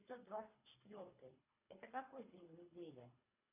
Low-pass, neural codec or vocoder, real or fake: 3.6 kHz; codec, 24 kHz, 3 kbps, HILCodec; fake